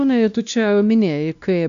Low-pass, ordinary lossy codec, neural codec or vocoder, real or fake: 7.2 kHz; AAC, 96 kbps; codec, 16 kHz, 1 kbps, X-Codec, WavLM features, trained on Multilingual LibriSpeech; fake